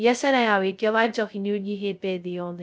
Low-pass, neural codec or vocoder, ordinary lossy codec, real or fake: none; codec, 16 kHz, 0.2 kbps, FocalCodec; none; fake